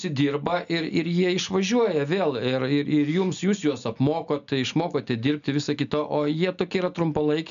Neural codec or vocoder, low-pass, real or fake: none; 7.2 kHz; real